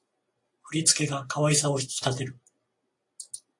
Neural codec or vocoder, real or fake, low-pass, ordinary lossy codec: none; real; 10.8 kHz; AAC, 48 kbps